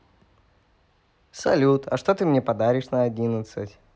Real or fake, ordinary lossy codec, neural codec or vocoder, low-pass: real; none; none; none